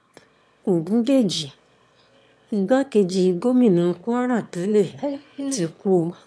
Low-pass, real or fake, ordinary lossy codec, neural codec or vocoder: none; fake; none; autoencoder, 22.05 kHz, a latent of 192 numbers a frame, VITS, trained on one speaker